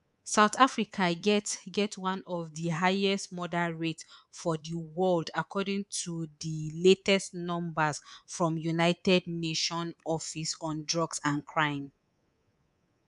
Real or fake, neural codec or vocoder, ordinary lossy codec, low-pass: fake; codec, 24 kHz, 3.1 kbps, DualCodec; none; 10.8 kHz